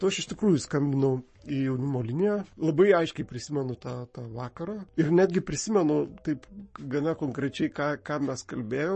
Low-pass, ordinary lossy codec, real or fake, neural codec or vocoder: 10.8 kHz; MP3, 32 kbps; fake; codec, 44.1 kHz, 7.8 kbps, Pupu-Codec